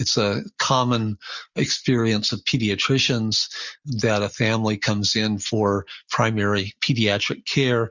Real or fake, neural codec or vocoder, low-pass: real; none; 7.2 kHz